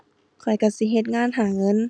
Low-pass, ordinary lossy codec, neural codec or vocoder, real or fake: none; none; none; real